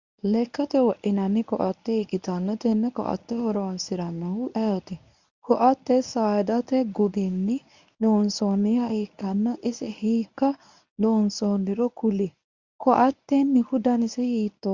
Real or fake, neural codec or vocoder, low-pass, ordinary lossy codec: fake; codec, 24 kHz, 0.9 kbps, WavTokenizer, medium speech release version 1; 7.2 kHz; Opus, 64 kbps